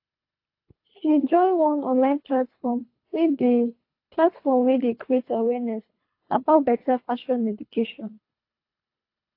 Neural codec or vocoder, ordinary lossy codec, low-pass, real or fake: codec, 24 kHz, 3 kbps, HILCodec; AAC, 32 kbps; 5.4 kHz; fake